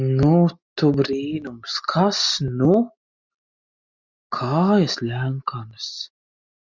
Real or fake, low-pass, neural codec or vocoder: real; 7.2 kHz; none